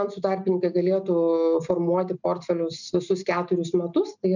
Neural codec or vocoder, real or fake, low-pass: none; real; 7.2 kHz